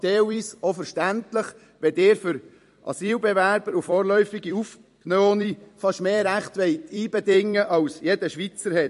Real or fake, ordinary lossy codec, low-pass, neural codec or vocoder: fake; MP3, 48 kbps; 14.4 kHz; vocoder, 44.1 kHz, 128 mel bands every 256 samples, BigVGAN v2